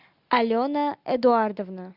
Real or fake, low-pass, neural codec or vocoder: real; 5.4 kHz; none